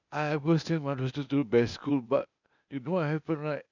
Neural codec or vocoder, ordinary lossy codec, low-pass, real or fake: codec, 16 kHz, 0.8 kbps, ZipCodec; none; 7.2 kHz; fake